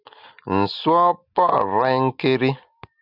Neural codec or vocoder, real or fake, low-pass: none; real; 5.4 kHz